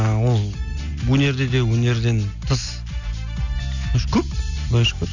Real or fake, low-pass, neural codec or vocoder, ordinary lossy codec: real; 7.2 kHz; none; none